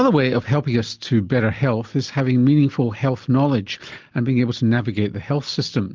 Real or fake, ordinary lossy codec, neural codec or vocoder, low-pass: real; Opus, 24 kbps; none; 7.2 kHz